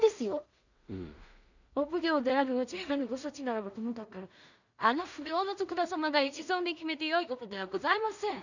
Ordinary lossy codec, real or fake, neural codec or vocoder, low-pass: none; fake; codec, 16 kHz in and 24 kHz out, 0.4 kbps, LongCat-Audio-Codec, two codebook decoder; 7.2 kHz